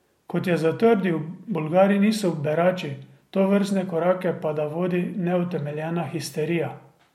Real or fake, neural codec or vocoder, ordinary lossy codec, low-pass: real; none; MP3, 64 kbps; 19.8 kHz